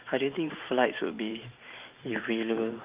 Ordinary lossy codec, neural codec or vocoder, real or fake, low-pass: Opus, 24 kbps; vocoder, 44.1 kHz, 128 mel bands every 512 samples, BigVGAN v2; fake; 3.6 kHz